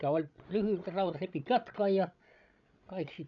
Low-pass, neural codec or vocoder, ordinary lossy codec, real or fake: 7.2 kHz; codec, 16 kHz, 16 kbps, FreqCodec, larger model; none; fake